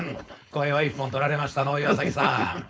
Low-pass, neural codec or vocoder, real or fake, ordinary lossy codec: none; codec, 16 kHz, 4.8 kbps, FACodec; fake; none